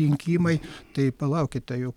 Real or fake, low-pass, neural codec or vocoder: real; 19.8 kHz; none